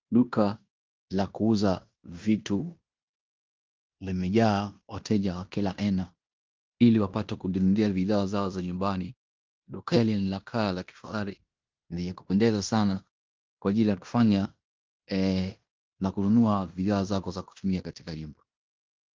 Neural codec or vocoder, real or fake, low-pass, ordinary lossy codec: codec, 16 kHz in and 24 kHz out, 0.9 kbps, LongCat-Audio-Codec, fine tuned four codebook decoder; fake; 7.2 kHz; Opus, 24 kbps